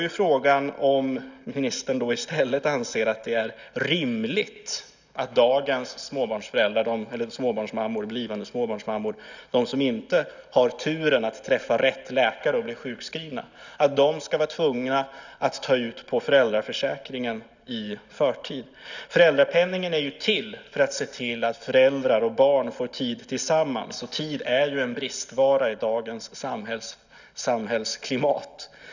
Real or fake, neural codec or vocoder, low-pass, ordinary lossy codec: real; none; 7.2 kHz; none